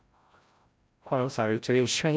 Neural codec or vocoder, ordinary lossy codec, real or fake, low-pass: codec, 16 kHz, 0.5 kbps, FreqCodec, larger model; none; fake; none